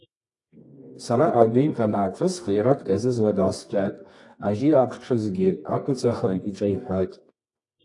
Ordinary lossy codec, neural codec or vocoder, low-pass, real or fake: AAC, 48 kbps; codec, 24 kHz, 0.9 kbps, WavTokenizer, medium music audio release; 10.8 kHz; fake